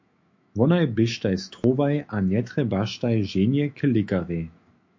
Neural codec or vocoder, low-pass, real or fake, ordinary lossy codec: autoencoder, 48 kHz, 128 numbers a frame, DAC-VAE, trained on Japanese speech; 7.2 kHz; fake; MP3, 48 kbps